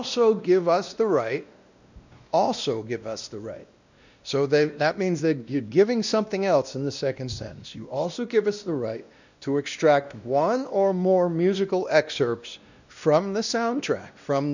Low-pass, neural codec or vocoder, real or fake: 7.2 kHz; codec, 16 kHz, 1 kbps, X-Codec, WavLM features, trained on Multilingual LibriSpeech; fake